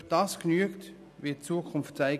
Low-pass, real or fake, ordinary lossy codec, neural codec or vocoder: 14.4 kHz; real; none; none